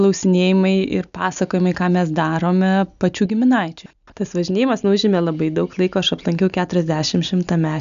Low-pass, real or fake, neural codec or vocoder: 7.2 kHz; real; none